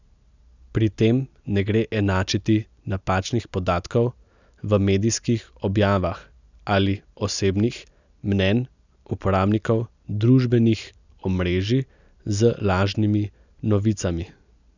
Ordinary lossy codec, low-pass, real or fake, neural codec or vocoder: none; 7.2 kHz; real; none